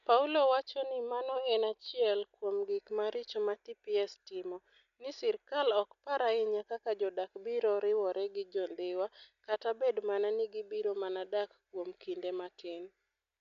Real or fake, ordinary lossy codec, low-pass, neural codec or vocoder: real; none; 7.2 kHz; none